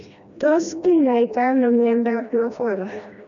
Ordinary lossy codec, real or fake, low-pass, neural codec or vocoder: none; fake; 7.2 kHz; codec, 16 kHz, 1 kbps, FreqCodec, smaller model